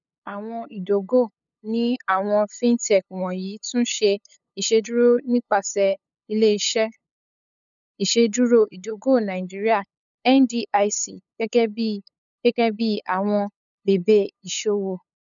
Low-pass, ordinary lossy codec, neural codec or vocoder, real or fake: 7.2 kHz; none; codec, 16 kHz, 8 kbps, FunCodec, trained on LibriTTS, 25 frames a second; fake